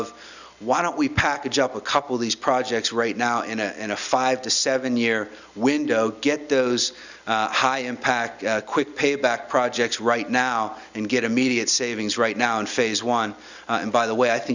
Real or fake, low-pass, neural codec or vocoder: real; 7.2 kHz; none